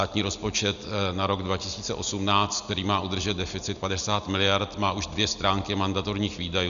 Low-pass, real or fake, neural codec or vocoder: 7.2 kHz; real; none